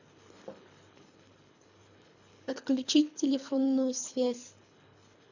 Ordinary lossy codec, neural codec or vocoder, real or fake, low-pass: none; codec, 24 kHz, 3 kbps, HILCodec; fake; 7.2 kHz